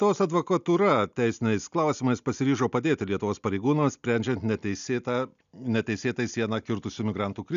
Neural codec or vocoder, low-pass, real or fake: none; 7.2 kHz; real